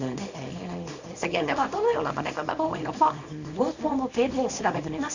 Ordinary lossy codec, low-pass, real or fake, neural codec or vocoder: Opus, 64 kbps; 7.2 kHz; fake; codec, 24 kHz, 0.9 kbps, WavTokenizer, small release